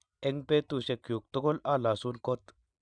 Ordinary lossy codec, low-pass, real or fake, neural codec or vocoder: none; 9.9 kHz; real; none